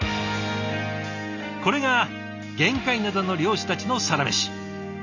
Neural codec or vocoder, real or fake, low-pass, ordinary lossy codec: none; real; 7.2 kHz; none